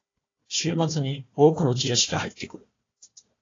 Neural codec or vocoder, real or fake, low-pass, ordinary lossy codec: codec, 16 kHz, 1 kbps, FunCodec, trained on Chinese and English, 50 frames a second; fake; 7.2 kHz; AAC, 32 kbps